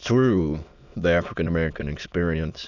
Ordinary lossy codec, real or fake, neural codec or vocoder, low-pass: Opus, 64 kbps; fake; autoencoder, 22.05 kHz, a latent of 192 numbers a frame, VITS, trained on many speakers; 7.2 kHz